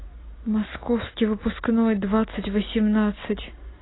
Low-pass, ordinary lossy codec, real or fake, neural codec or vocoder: 7.2 kHz; AAC, 16 kbps; real; none